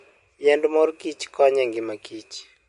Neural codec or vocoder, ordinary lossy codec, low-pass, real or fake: none; MP3, 48 kbps; 14.4 kHz; real